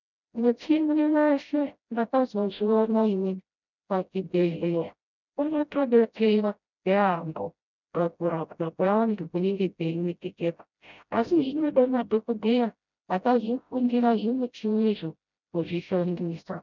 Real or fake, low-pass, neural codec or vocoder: fake; 7.2 kHz; codec, 16 kHz, 0.5 kbps, FreqCodec, smaller model